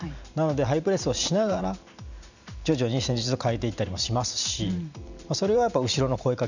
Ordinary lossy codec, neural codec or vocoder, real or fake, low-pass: none; vocoder, 44.1 kHz, 128 mel bands every 512 samples, BigVGAN v2; fake; 7.2 kHz